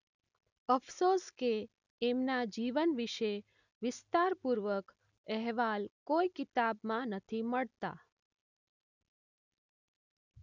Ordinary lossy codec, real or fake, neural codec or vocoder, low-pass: none; real; none; 7.2 kHz